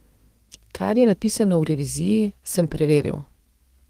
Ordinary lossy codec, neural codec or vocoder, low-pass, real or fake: Opus, 24 kbps; codec, 32 kHz, 1.9 kbps, SNAC; 14.4 kHz; fake